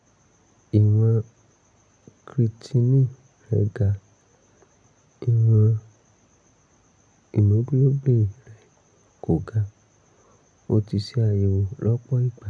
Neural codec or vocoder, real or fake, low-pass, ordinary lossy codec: none; real; 9.9 kHz; none